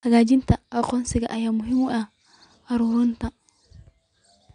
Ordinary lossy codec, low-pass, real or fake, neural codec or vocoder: none; 9.9 kHz; real; none